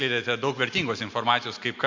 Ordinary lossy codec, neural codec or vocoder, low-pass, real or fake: MP3, 48 kbps; none; 7.2 kHz; real